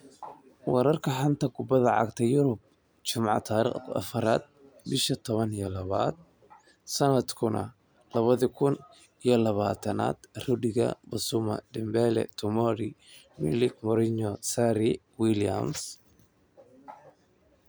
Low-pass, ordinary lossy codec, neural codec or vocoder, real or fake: none; none; none; real